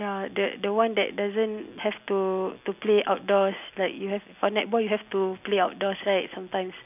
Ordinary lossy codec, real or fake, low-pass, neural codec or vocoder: none; real; 3.6 kHz; none